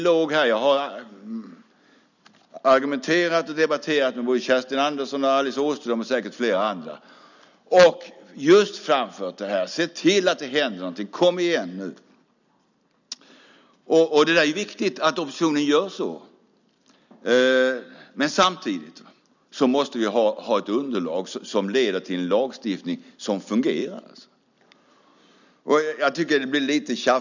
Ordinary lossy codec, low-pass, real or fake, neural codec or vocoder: none; 7.2 kHz; real; none